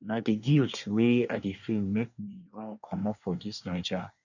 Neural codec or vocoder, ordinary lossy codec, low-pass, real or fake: codec, 24 kHz, 1 kbps, SNAC; none; 7.2 kHz; fake